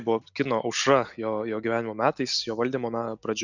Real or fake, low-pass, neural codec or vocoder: real; 7.2 kHz; none